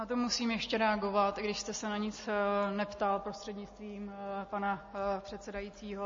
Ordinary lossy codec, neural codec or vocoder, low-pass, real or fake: MP3, 32 kbps; none; 7.2 kHz; real